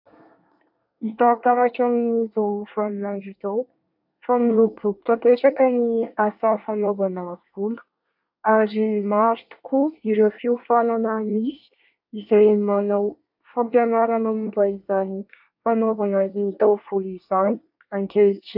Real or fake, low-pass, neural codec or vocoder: fake; 5.4 kHz; codec, 24 kHz, 1 kbps, SNAC